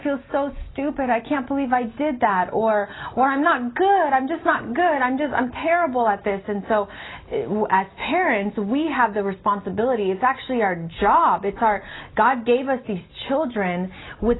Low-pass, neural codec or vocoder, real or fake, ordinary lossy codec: 7.2 kHz; none; real; AAC, 16 kbps